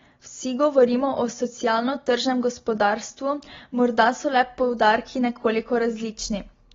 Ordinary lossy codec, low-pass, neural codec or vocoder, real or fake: AAC, 24 kbps; 7.2 kHz; none; real